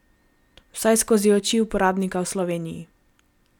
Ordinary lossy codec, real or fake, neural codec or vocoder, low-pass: none; real; none; 19.8 kHz